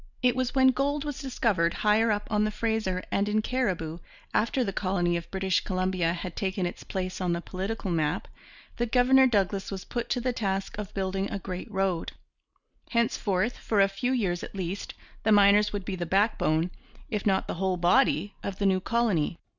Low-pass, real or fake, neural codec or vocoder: 7.2 kHz; real; none